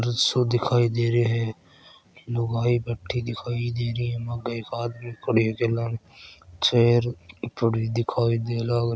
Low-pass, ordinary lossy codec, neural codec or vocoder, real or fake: none; none; none; real